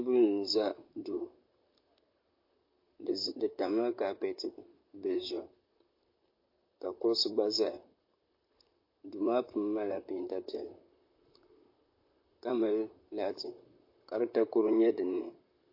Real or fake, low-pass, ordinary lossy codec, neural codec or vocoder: fake; 5.4 kHz; MP3, 32 kbps; vocoder, 44.1 kHz, 128 mel bands, Pupu-Vocoder